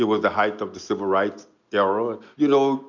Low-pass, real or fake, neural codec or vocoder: 7.2 kHz; real; none